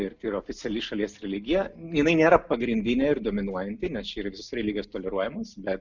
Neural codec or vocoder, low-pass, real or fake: none; 7.2 kHz; real